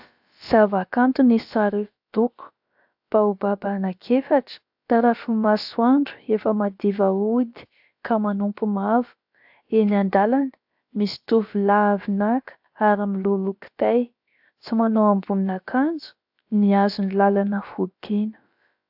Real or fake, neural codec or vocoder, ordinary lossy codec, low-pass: fake; codec, 16 kHz, about 1 kbps, DyCAST, with the encoder's durations; AAC, 48 kbps; 5.4 kHz